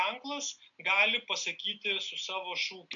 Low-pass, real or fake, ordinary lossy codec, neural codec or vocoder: 7.2 kHz; real; AAC, 64 kbps; none